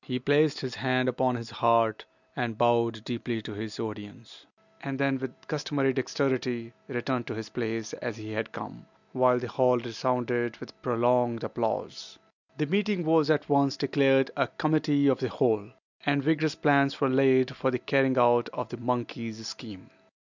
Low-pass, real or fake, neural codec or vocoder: 7.2 kHz; real; none